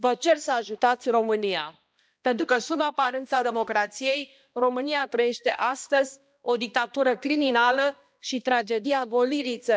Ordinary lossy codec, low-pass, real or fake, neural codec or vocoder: none; none; fake; codec, 16 kHz, 1 kbps, X-Codec, HuBERT features, trained on balanced general audio